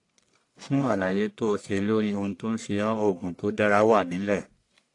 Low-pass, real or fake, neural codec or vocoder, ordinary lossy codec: 10.8 kHz; fake; codec, 44.1 kHz, 1.7 kbps, Pupu-Codec; AAC, 48 kbps